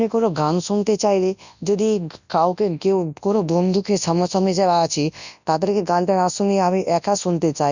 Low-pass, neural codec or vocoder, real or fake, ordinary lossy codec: 7.2 kHz; codec, 24 kHz, 0.9 kbps, WavTokenizer, large speech release; fake; none